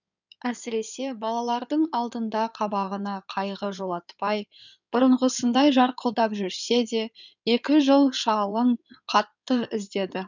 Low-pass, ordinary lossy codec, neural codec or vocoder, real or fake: 7.2 kHz; none; codec, 16 kHz in and 24 kHz out, 2.2 kbps, FireRedTTS-2 codec; fake